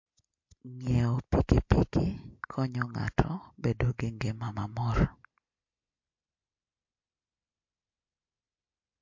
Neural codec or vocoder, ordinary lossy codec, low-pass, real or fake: none; MP3, 48 kbps; 7.2 kHz; real